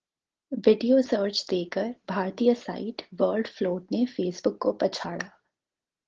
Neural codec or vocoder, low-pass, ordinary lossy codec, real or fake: none; 7.2 kHz; Opus, 16 kbps; real